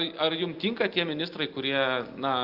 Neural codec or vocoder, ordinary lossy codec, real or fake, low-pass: none; Opus, 32 kbps; real; 5.4 kHz